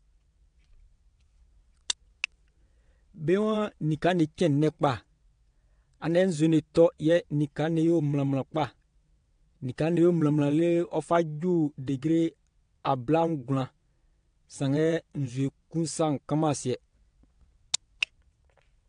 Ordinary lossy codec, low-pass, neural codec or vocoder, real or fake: AAC, 48 kbps; 9.9 kHz; vocoder, 22.05 kHz, 80 mel bands, WaveNeXt; fake